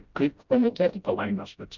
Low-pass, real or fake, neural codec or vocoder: 7.2 kHz; fake; codec, 16 kHz, 0.5 kbps, FreqCodec, smaller model